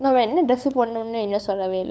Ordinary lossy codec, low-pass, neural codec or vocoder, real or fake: none; none; codec, 16 kHz, 8 kbps, FunCodec, trained on LibriTTS, 25 frames a second; fake